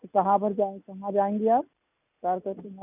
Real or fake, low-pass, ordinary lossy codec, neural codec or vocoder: real; 3.6 kHz; none; none